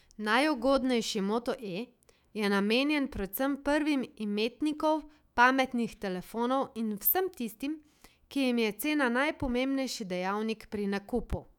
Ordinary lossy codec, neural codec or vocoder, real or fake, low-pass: none; autoencoder, 48 kHz, 128 numbers a frame, DAC-VAE, trained on Japanese speech; fake; 19.8 kHz